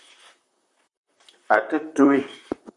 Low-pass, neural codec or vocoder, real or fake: 10.8 kHz; vocoder, 44.1 kHz, 128 mel bands, Pupu-Vocoder; fake